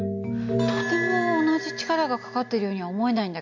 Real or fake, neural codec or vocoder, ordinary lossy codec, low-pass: real; none; AAC, 48 kbps; 7.2 kHz